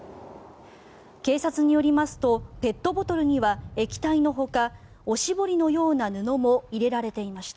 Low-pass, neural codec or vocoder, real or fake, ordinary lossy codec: none; none; real; none